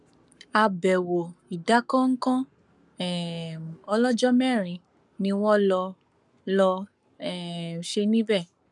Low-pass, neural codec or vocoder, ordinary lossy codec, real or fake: 10.8 kHz; codec, 44.1 kHz, 7.8 kbps, Pupu-Codec; none; fake